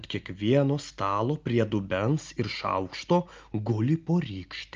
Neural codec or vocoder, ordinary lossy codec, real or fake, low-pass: none; Opus, 24 kbps; real; 7.2 kHz